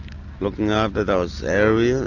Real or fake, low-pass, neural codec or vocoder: real; 7.2 kHz; none